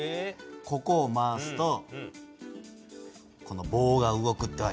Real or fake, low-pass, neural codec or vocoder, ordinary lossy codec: real; none; none; none